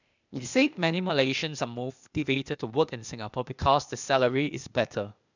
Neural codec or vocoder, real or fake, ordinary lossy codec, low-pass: codec, 16 kHz, 0.8 kbps, ZipCodec; fake; none; 7.2 kHz